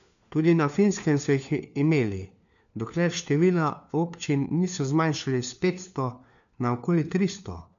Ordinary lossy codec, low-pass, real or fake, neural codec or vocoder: none; 7.2 kHz; fake; codec, 16 kHz, 4 kbps, FunCodec, trained on LibriTTS, 50 frames a second